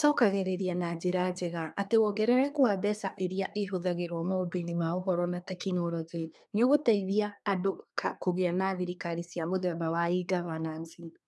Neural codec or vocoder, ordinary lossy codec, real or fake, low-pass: codec, 24 kHz, 1 kbps, SNAC; none; fake; none